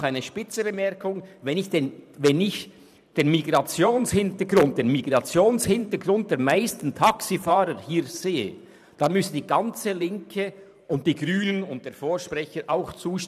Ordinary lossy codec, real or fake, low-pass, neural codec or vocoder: none; fake; 14.4 kHz; vocoder, 44.1 kHz, 128 mel bands every 512 samples, BigVGAN v2